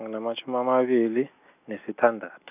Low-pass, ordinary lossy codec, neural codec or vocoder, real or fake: 3.6 kHz; none; none; real